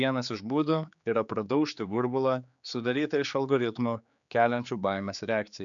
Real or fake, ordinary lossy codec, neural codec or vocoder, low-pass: fake; MP3, 96 kbps; codec, 16 kHz, 4 kbps, X-Codec, HuBERT features, trained on general audio; 7.2 kHz